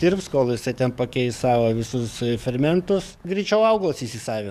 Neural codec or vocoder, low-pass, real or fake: codec, 44.1 kHz, 7.8 kbps, DAC; 14.4 kHz; fake